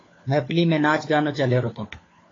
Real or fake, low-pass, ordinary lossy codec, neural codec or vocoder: fake; 7.2 kHz; AAC, 32 kbps; codec, 16 kHz, 4 kbps, FunCodec, trained on LibriTTS, 50 frames a second